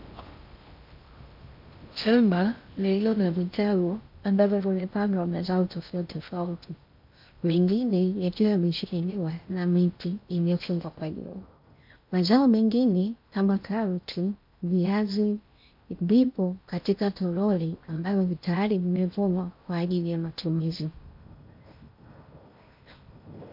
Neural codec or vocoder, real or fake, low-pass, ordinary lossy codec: codec, 16 kHz in and 24 kHz out, 0.6 kbps, FocalCodec, streaming, 2048 codes; fake; 5.4 kHz; MP3, 48 kbps